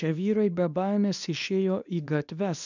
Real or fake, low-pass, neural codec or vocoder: fake; 7.2 kHz; codec, 24 kHz, 0.9 kbps, WavTokenizer, medium speech release version 2